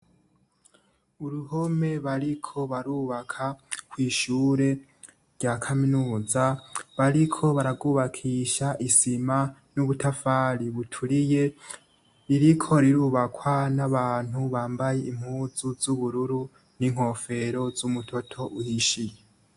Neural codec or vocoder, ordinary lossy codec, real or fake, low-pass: none; AAC, 64 kbps; real; 10.8 kHz